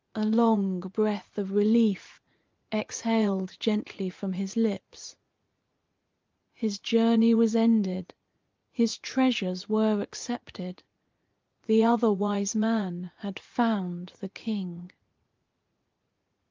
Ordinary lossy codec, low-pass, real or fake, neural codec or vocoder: Opus, 32 kbps; 7.2 kHz; fake; vocoder, 44.1 kHz, 80 mel bands, Vocos